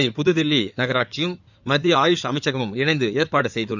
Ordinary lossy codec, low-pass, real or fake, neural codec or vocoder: none; 7.2 kHz; fake; codec, 16 kHz in and 24 kHz out, 2.2 kbps, FireRedTTS-2 codec